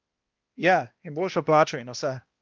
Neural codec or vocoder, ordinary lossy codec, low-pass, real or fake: codec, 16 kHz, 1 kbps, X-Codec, HuBERT features, trained on balanced general audio; Opus, 32 kbps; 7.2 kHz; fake